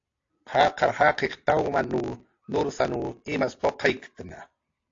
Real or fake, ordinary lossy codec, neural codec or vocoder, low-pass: real; AAC, 48 kbps; none; 7.2 kHz